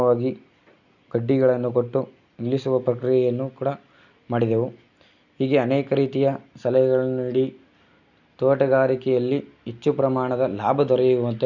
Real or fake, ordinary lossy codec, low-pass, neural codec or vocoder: real; none; 7.2 kHz; none